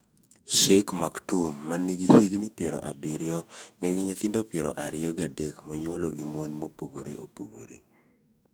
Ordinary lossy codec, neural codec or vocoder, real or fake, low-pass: none; codec, 44.1 kHz, 2.6 kbps, DAC; fake; none